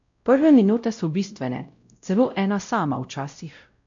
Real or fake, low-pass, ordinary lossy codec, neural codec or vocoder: fake; 7.2 kHz; MP3, 64 kbps; codec, 16 kHz, 0.5 kbps, X-Codec, WavLM features, trained on Multilingual LibriSpeech